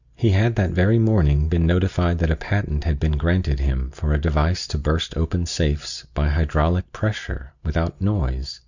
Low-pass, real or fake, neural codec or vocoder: 7.2 kHz; real; none